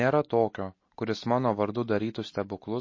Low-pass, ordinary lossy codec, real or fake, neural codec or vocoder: 7.2 kHz; MP3, 32 kbps; real; none